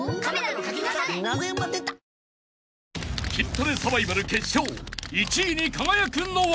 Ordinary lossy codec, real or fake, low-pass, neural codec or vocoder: none; real; none; none